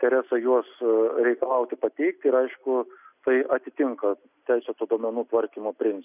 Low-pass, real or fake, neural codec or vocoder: 3.6 kHz; real; none